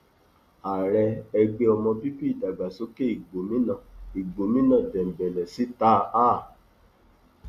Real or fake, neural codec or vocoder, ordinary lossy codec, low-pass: real; none; Opus, 64 kbps; 14.4 kHz